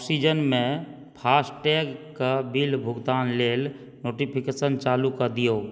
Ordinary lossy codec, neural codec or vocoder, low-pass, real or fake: none; none; none; real